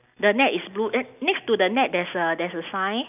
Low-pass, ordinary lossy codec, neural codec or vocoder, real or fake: 3.6 kHz; none; none; real